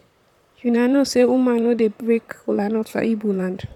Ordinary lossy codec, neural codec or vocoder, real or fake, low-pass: none; vocoder, 44.1 kHz, 128 mel bands, Pupu-Vocoder; fake; 19.8 kHz